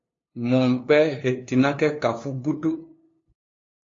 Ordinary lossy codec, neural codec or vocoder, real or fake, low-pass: AAC, 32 kbps; codec, 16 kHz, 2 kbps, FunCodec, trained on LibriTTS, 25 frames a second; fake; 7.2 kHz